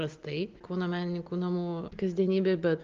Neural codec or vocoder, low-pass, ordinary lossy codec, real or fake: none; 7.2 kHz; Opus, 32 kbps; real